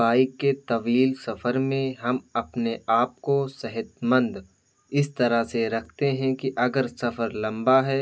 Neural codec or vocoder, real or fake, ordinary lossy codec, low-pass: none; real; none; none